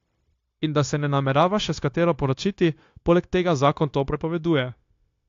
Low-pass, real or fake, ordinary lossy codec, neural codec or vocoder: 7.2 kHz; fake; AAC, 48 kbps; codec, 16 kHz, 0.9 kbps, LongCat-Audio-Codec